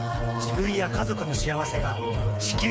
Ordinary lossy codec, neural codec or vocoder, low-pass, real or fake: none; codec, 16 kHz, 4 kbps, FreqCodec, smaller model; none; fake